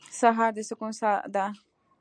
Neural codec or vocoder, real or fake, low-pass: none; real; 9.9 kHz